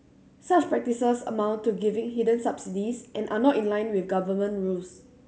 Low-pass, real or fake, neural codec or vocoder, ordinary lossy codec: none; real; none; none